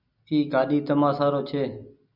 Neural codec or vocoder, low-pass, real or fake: none; 5.4 kHz; real